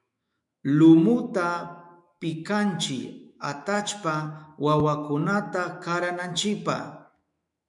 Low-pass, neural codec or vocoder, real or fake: 10.8 kHz; autoencoder, 48 kHz, 128 numbers a frame, DAC-VAE, trained on Japanese speech; fake